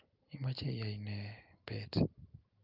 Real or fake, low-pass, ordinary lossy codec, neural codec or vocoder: real; 5.4 kHz; Opus, 24 kbps; none